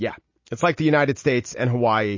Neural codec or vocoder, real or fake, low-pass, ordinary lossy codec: none; real; 7.2 kHz; MP3, 32 kbps